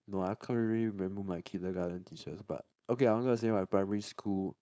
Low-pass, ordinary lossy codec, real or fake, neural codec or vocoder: none; none; fake; codec, 16 kHz, 4.8 kbps, FACodec